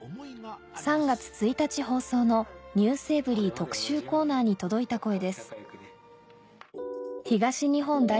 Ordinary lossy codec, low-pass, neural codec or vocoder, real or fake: none; none; none; real